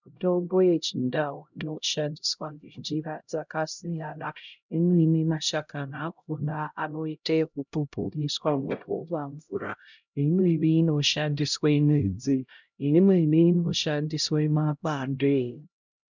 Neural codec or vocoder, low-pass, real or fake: codec, 16 kHz, 0.5 kbps, X-Codec, HuBERT features, trained on LibriSpeech; 7.2 kHz; fake